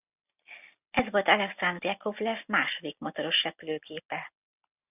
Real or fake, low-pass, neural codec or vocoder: real; 3.6 kHz; none